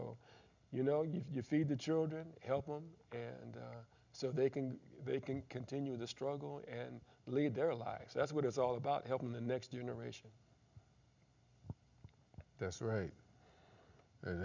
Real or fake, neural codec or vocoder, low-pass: real; none; 7.2 kHz